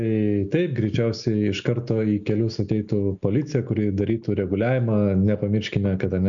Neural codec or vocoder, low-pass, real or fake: none; 7.2 kHz; real